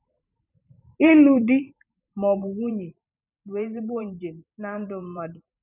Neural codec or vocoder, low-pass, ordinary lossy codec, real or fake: vocoder, 44.1 kHz, 128 mel bands every 512 samples, BigVGAN v2; 3.6 kHz; none; fake